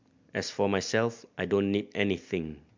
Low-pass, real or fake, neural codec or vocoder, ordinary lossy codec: 7.2 kHz; real; none; MP3, 64 kbps